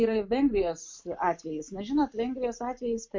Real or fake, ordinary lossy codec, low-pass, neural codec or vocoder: real; MP3, 48 kbps; 7.2 kHz; none